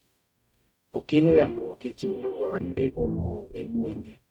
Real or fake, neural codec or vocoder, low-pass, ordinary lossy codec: fake; codec, 44.1 kHz, 0.9 kbps, DAC; 19.8 kHz; none